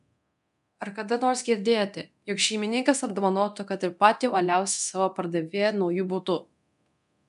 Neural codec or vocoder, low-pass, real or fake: codec, 24 kHz, 0.9 kbps, DualCodec; 10.8 kHz; fake